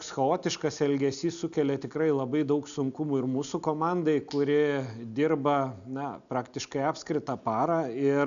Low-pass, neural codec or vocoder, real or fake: 7.2 kHz; none; real